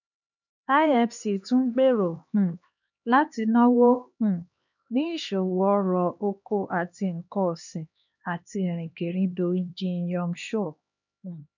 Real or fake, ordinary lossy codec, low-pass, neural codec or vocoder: fake; none; 7.2 kHz; codec, 16 kHz, 2 kbps, X-Codec, HuBERT features, trained on LibriSpeech